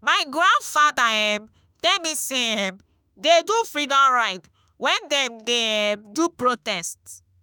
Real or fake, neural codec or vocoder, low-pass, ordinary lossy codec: fake; autoencoder, 48 kHz, 32 numbers a frame, DAC-VAE, trained on Japanese speech; none; none